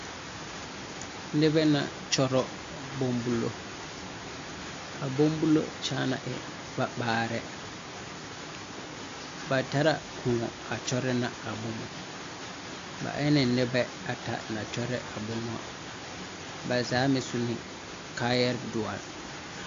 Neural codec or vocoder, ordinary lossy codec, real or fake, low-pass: none; AAC, 48 kbps; real; 7.2 kHz